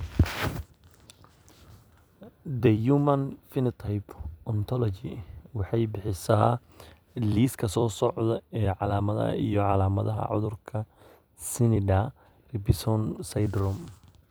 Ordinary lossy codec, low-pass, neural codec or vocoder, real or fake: none; none; vocoder, 44.1 kHz, 128 mel bands every 256 samples, BigVGAN v2; fake